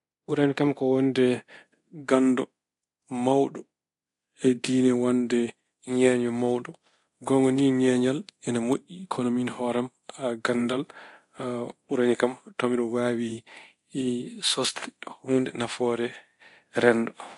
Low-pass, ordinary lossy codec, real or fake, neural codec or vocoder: 10.8 kHz; AAC, 48 kbps; fake; codec, 24 kHz, 0.9 kbps, DualCodec